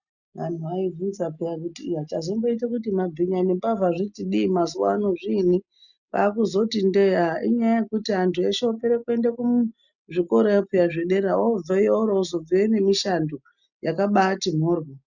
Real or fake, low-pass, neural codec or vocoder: real; 7.2 kHz; none